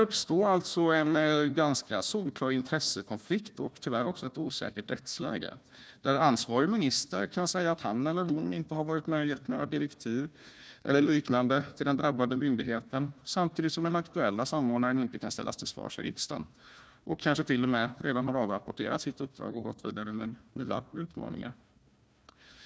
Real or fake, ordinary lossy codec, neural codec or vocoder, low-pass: fake; none; codec, 16 kHz, 1 kbps, FunCodec, trained on Chinese and English, 50 frames a second; none